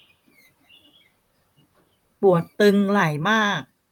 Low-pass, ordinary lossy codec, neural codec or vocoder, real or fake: 19.8 kHz; none; vocoder, 44.1 kHz, 128 mel bands, Pupu-Vocoder; fake